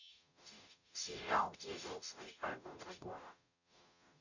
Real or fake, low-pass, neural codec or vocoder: fake; 7.2 kHz; codec, 44.1 kHz, 0.9 kbps, DAC